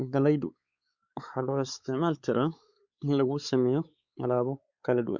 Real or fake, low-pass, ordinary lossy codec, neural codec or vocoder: fake; 7.2 kHz; Opus, 64 kbps; codec, 16 kHz, 4 kbps, X-Codec, WavLM features, trained on Multilingual LibriSpeech